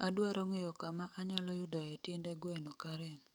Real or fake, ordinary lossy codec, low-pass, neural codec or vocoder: fake; none; none; codec, 44.1 kHz, 7.8 kbps, DAC